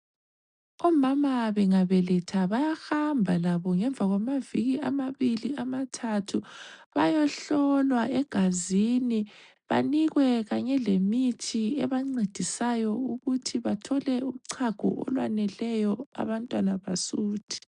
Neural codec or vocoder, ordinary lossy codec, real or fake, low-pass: none; MP3, 96 kbps; real; 9.9 kHz